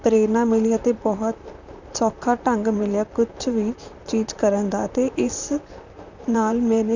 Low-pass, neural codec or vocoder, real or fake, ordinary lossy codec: 7.2 kHz; vocoder, 44.1 kHz, 128 mel bands, Pupu-Vocoder; fake; none